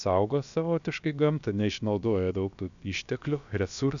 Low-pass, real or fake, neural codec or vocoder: 7.2 kHz; fake; codec, 16 kHz, about 1 kbps, DyCAST, with the encoder's durations